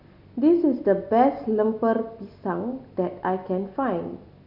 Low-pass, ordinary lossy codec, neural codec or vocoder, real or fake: 5.4 kHz; none; none; real